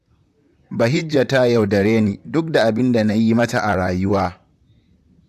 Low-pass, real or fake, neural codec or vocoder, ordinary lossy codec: 14.4 kHz; fake; vocoder, 44.1 kHz, 128 mel bands, Pupu-Vocoder; AAC, 96 kbps